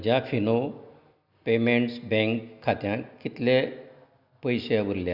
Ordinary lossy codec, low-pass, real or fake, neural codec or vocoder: none; 5.4 kHz; real; none